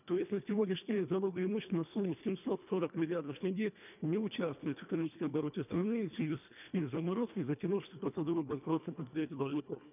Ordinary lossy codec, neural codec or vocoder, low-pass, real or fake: none; codec, 24 kHz, 1.5 kbps, HILCodec; 3.6 kHz; fake